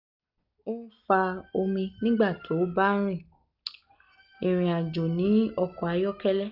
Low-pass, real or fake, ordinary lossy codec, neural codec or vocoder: 5.4 kHz; real; none; none